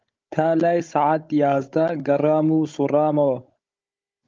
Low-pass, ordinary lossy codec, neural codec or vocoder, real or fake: 7.2 kHz; Opus, 24 kbps; codec, 16 kHz, 16 kbps, FunCodec, trained on Chinese and English, 50 frames a second; fake